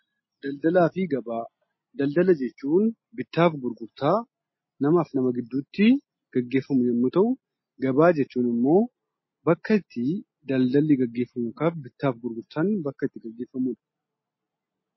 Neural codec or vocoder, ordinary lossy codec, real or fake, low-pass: none; MP3, 24 kbps; real; 7.2 kHz